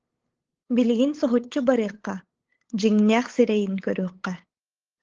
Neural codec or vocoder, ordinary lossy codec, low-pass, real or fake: codec, 16 kHz, 8 kbps, FunCodec, trained on LibriTTS, 25 frames a second; Opus, 16 kbps; 7.2 kHz; fake